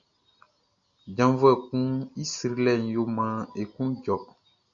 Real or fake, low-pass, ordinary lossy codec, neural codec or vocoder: real; 7.2 kHz; MP3, 96 kbps; none